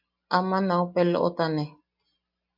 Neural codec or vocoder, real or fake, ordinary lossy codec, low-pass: none; real; MP3, 48 kbps; 5.4 kHz